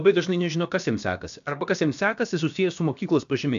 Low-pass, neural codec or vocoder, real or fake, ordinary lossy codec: 7.2 kHz; codec, 16 kHz, about 1 kbps, DyCAST, with the encoder's durations; fake; AAC, 96 kbps